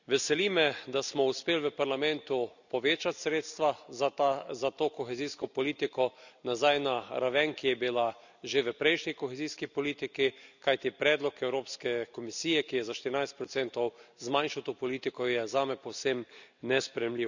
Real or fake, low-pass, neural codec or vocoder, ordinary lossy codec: real; 7.2 kHz; none; none